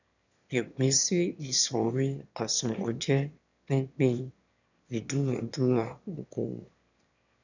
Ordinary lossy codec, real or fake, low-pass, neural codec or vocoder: none; fake; 7.2 kHz; autoencoder, 22.05 kHz, a latent of 192 numbers a frame, VITS, trained on one speaker